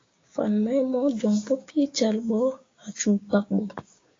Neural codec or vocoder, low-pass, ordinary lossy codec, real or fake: codec, 16 kHz, 6 kbps, DAC; 7.2 kHz; AAC, 32 kbps; fake